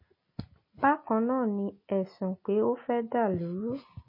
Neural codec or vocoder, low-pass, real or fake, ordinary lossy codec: none; 5.4 kHz; real; MP3, 24 kbps